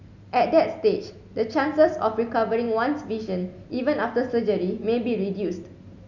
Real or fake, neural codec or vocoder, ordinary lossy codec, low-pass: real; none; none; 7.2 kHz